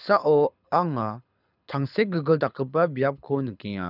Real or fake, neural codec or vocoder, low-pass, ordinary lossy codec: fake; codec, 24 kHz, 6 kbps, HILCodec; 5.4 kHz; none